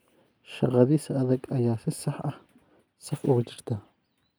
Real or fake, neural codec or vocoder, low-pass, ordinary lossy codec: real; none; none; none